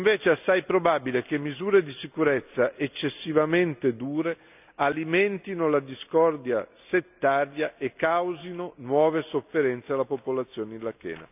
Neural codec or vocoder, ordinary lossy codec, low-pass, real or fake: none; none; 3.6 kHz; real